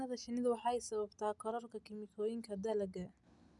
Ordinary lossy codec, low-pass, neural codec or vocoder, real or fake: none; 10.8 kHz; none; real